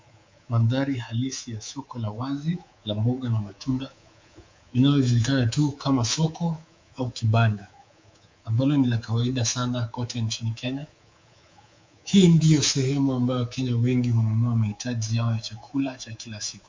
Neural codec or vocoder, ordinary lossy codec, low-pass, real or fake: codec, 24 kHz, 3.1 kbps, DualCodec; MP3, 64 kbps; 7.2 kHz; fake